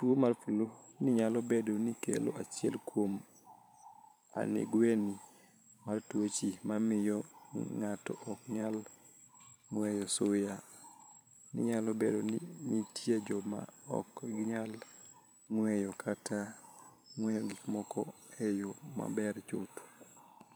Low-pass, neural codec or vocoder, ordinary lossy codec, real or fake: none; vocoder, 44.1 kHz, 128 mel bands every 512 samples, BigVGAN v2; none; fake